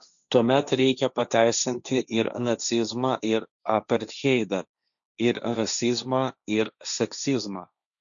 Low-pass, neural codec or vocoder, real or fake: 7.2 kHz; codec, 16 kHz, 1.1 kbps, Voila-Tokenizer; fake